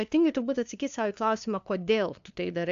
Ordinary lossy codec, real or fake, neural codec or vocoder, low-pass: MP3, 64 kbps; fake; codec, 16 kHz, 2 kbps, FunCodec, trained on LibriTTS, 25 frames a second; 7.2 kHz